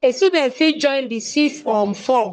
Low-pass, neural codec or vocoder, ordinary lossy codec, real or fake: 9.9 kHz; codec, 44.1 kHz, 1.7 kbps, Pupu-Codec; none; fake